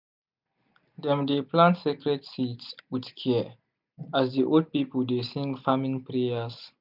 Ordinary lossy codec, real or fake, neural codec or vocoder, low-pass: none; real; none; 5.4 kHz